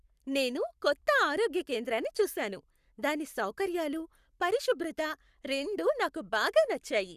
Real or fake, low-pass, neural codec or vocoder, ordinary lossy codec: fake; 14.4 kHz; codec, 44.1 kHz, 7.8 kbps, Pupu-Codec; Opus, 64 kbps